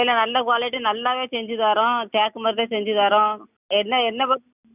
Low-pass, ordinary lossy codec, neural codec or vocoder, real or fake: 3.6 kHz; none; none; real